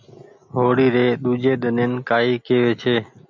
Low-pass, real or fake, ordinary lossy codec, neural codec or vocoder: 7.2 kHz; real; MP3, 64 kbps; none